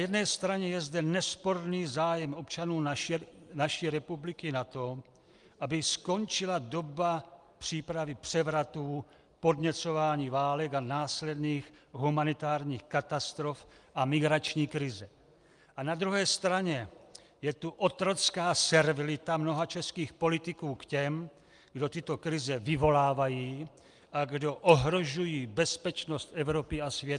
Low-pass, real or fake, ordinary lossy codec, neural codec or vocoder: 10.8 kHz; real; Opus, 32 kbps; none